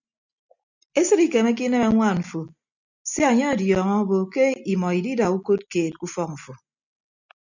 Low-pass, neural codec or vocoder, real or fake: 7.2 kHz; none; real